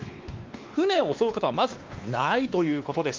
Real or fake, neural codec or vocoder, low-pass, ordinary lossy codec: fake; codec, 16 kHz, 2 kbps, X-Codec, WavLM features, trained on Multilingual LibriSpeech; 7.2 kHz; Opus, 24 kbps